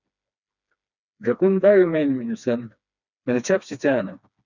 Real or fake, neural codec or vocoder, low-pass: fake; codec, 16 kHz, 2 kbps, FreqCodec, smaller model; 7.2 kHz